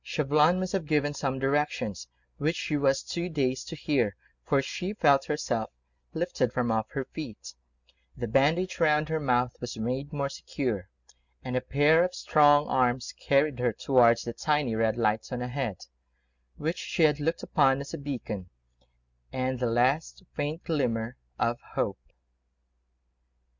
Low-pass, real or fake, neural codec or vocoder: 7.2 kHz; real; none